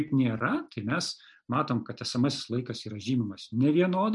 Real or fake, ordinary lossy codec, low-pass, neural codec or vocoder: real; MP3, 96 kbps; 10.8 kHz; none